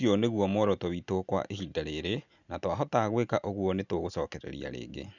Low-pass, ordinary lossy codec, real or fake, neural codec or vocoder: 7.2 kHz; none; real; none